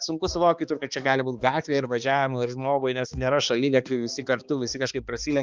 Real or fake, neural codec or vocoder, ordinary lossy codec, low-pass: fake; codec, 16 kHz, 2 kbps, X-Codec, HuBERT features, trained on balanced general audio; Opus, 24 kbps; 7.2 kHz